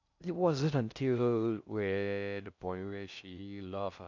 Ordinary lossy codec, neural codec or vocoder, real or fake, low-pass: none; codec, 16 kHz in and 24 kHz out, 0.6 kbps, FocalCodec, streaming, 2048 codes; fake; 7.2 kHz